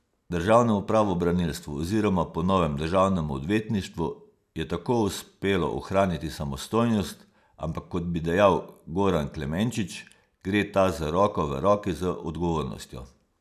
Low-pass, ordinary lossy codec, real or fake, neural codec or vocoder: 14.4 kHz; none; real; none